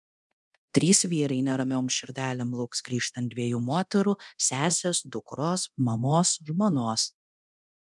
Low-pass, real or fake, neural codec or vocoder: 10.8 kHz; fake; codec, 24 kHz, 0.9 kbps, DualCodec